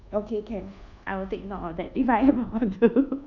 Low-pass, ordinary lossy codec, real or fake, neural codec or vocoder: 7.2 kHz; none; fake; codec, 24 kHz, 1.2 kbps, DualCodec